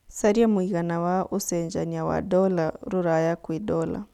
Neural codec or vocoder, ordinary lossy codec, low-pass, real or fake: none; none; 19.8 kHz; real